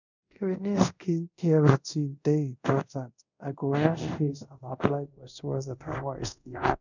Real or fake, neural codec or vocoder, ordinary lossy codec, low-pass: fake; codec, 24 kHz, 0.5 kbps, DualCodec; none; 7.2 kHz